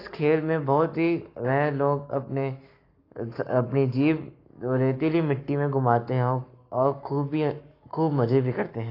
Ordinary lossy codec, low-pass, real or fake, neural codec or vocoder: AAC, 32 kbps; 5.4 kHz; fake; codec, 44.1 kHz, 7.8 kbps, Pupu-Codec